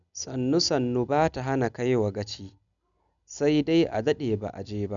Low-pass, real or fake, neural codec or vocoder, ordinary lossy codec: 7.2 kHz; real; none; none